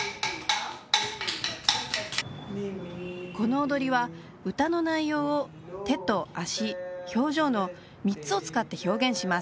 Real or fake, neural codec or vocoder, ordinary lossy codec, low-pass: real; none; none; none